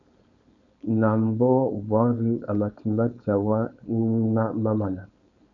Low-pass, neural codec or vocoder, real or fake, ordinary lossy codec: 7.2 kHz; codec, 16 kHz, 4.8 kbps, FACodec; fake; MP3, 96 kbps